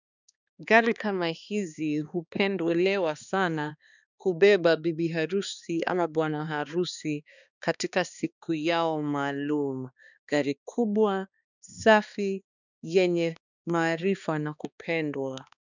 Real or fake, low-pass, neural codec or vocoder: fake; 7.2 kHz; codec, 16 kHz, 2 kbps, X-Codec, HuBERT features, trained on balanced general audio